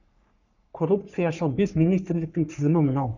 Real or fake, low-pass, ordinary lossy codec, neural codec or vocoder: fake; 7.2 kHz; none; codec, 44.1 kHz, 3.4 kbps, Pupu-Codec